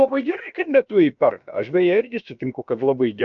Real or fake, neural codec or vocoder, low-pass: fake; codec, 16 kHz, about 1 kbps, DyCAST, with the encoder's durations; 7.2 kHz